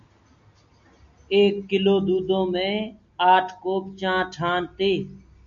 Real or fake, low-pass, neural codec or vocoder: real; 7.2 kHz; none